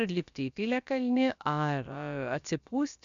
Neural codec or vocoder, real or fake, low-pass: codec, 16 kHz, about 1 kbps, DyCAST, with the encoder's durations; fake; 7.2 kHz